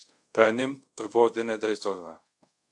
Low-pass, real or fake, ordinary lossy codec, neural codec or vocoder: 10.8 kHz; fake; AAC, 64 kbps; codec, 24 kHz, 0.5 kbps, DualCodec